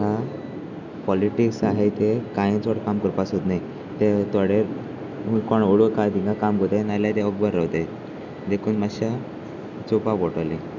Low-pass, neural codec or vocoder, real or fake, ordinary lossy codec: 7.2 kHz; none; real; none